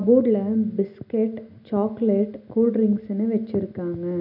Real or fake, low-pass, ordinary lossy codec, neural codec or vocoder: real; 5.4 kHz; none; none